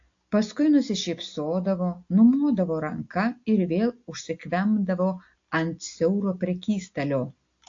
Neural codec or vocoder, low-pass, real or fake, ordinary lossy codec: none; 7.2 kHz; real; AAC, 48 kbps